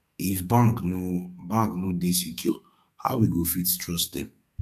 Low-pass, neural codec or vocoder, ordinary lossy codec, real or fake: 14.4 kHz; codec, 32 kHz, 1.9 kbps, SNAC; none; fake